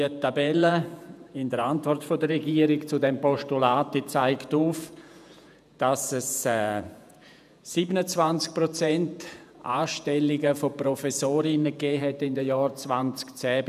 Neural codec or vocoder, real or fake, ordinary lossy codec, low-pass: vocoder, 48 kHz, 128 mel bands, Vocos; fake; none; 14.4 kHz